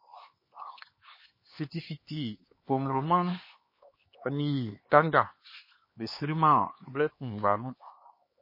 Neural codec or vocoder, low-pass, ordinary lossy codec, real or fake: codec, 16 kHz, 2 kbps, X-Codec, HuBERT features, trained on LibriSpeech; 5.4 kHz; MP3, 24 kbps; fake